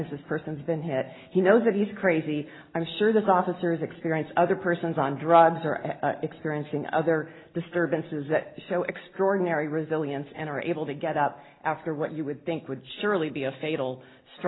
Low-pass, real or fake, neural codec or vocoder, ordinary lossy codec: 7.2 kHz; real; none; AAC, 16 kbps